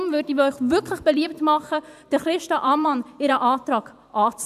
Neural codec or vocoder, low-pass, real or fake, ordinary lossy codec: vocoder, 44.1 kHz, 128 mel bands every 512 samples, BigVGAN v2; 14.4 kHz; fake; none